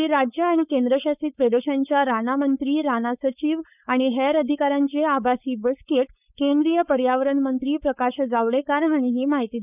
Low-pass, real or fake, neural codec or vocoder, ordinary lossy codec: 3.6 kHz; fake; codec, 16 kHz, 4.8 kbps, FACodec; none